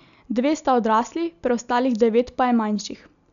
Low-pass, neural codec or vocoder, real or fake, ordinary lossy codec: 7.2 kHz; none; real; none